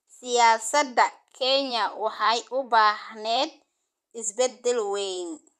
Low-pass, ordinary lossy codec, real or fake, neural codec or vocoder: 14.4 kHz; none; fake; vocoder, 44.1 kHz, 128 mel bands, Pupu-Vocoder